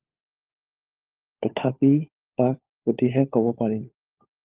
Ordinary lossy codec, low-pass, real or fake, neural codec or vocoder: Opus, 32 kbps; 3.6 kHz; fake; codec, 16 kHz, 4 kbps, FunCodec, trained on LibriTTS, 50 frames a second